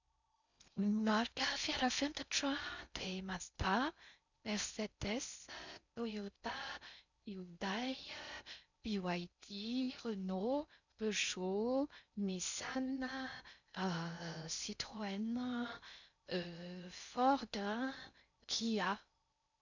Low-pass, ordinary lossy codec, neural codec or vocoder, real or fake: 7.2 kHz; none; codec, 16 kHz in and 24 kHz out, 0.6 kbps, FocalCodec, streaming, 4096 codes; fake